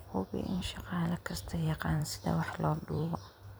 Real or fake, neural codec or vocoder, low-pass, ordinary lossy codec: fake; vocoder, 44.1 kHz, 128 mel bands every 256 samples, BigVGAN v2; none; none